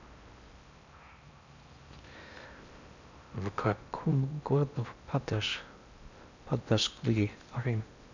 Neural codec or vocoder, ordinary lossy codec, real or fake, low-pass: codec, 16 kHz in and 24 kHz out, 0.6 kbps, FocalCodec, streaming, 4096 codes; none; fake; 7.2 kHz